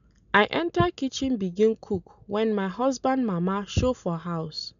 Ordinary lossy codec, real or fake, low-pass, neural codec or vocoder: none; real; 7.2 kHz; none